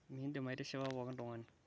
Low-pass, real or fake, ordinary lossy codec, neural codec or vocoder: none; real; none; none